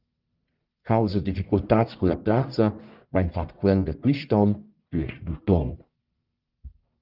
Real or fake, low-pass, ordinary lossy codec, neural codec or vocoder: fake; 5.4 kHz; Opus, 32 kbps; codec, 44.1 kHz, 1.7 kbps, Pupu-Codec